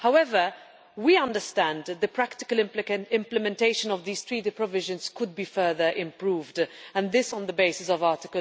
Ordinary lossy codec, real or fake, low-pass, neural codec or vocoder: none; real; none; none